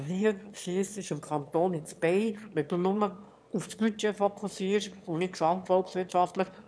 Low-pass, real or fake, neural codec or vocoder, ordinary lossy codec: none; fake; autoencoder, 22.05 kHz, a latent of 192 numbers a frame, VITS, trained on one speaker; none